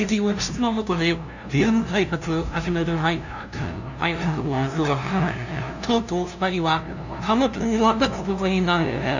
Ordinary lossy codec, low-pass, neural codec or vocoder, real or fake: none; 7.2 kHz; codec, 16 kHz, 0.5 kbps, FunCodec, trained on LibriTTS, 25 frames a second; fake